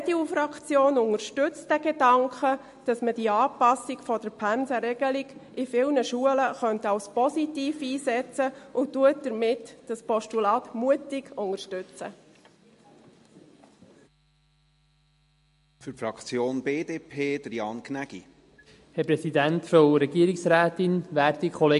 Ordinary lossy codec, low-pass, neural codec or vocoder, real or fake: MP3, 48 kbps; 14.4 kHz; none; real